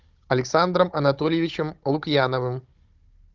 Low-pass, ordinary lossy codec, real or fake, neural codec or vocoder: 7.2 kHz; Opus, 32 kbps; fake; codec, 16 kHz, 16 kbps, FunCodec, trained on Chinese and English, 50 frames a second